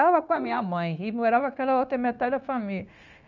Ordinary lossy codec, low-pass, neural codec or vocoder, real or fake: none; 7.2 kHz; codec, 16 kHz, 0.9 kbps, LongCat-Audio-Codec; fake